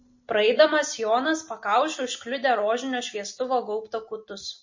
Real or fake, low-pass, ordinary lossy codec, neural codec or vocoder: real; 7.2 kHz; MP3, 32 kbps; none